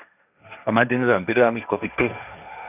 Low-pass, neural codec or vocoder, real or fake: 3.6 kHz; codec, 16 kHz, 1.1 kbps, Voila-Tokenizer; fake